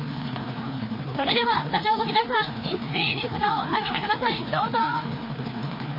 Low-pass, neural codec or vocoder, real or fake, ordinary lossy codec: 5.4 kHz; codec, 16 kHz, 2 kbps, FreqCodec, larger model; fake; MP3, 24 kbps